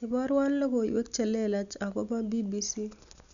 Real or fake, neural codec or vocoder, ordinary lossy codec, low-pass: real; none; none; 7.2 kHz